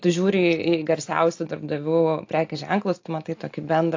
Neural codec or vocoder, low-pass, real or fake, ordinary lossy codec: none; 7.2 kHz; real; AAC, 48 kbps